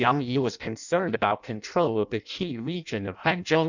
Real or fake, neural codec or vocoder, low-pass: fake; codec, 16 kHz in and 24 kHz out, 0.6 kbps, FireRedTTS-2 codec; 7.2 kHz